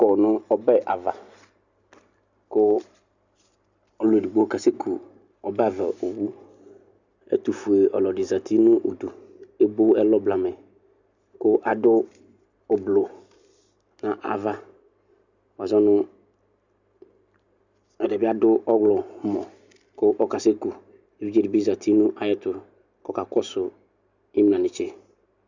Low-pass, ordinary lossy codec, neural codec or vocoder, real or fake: 7.2 kHz; Opus, 64 kbps; none; real